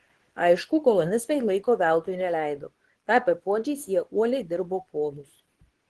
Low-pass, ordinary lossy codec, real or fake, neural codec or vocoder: 10.8 kHz; Opus, 16 kbps; fake; codec, 24 kHz, 0.9 kbps, WavTokenizer, medium speech release version 2